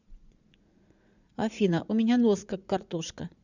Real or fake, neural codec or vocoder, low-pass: fake; vocoder, 44.1 kHz, 80 mel bands, Vocos; 7.2 kHz